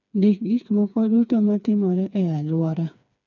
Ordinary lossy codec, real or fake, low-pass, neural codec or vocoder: none; fake; 7.2 kHz; codec, 16 kHz, 4 kbps, FreqCodec, smaller model